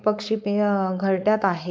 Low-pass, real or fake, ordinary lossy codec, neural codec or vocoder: none; fake; none; codec, 16 kHz, 4.8 kbps, FACodec